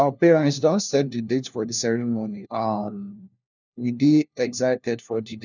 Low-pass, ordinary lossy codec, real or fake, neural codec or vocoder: 7.2 kHz; none; fake; codec, 16 kHz, 1 kbps, FunCodec, trained on LibriTTS, 50 frames a second